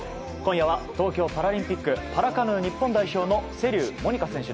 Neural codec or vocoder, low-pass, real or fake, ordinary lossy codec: none; none; real; none